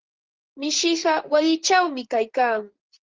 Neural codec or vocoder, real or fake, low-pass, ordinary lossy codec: vocoder, 44.1 kHz, 128 mel bands, Pupu-Vocoder; fake; 7.2 kHz; Opus, 16 kbps